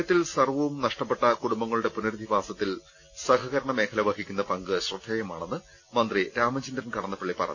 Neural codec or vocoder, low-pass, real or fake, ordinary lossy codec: none; 7.2 kHz; real; none